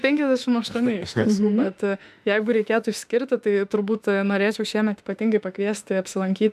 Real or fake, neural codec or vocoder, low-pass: fake; autoencoder, 48 kHz, 32 numbers a frame, DAC-VAE, trained on Japanese speech; 14.4 kHz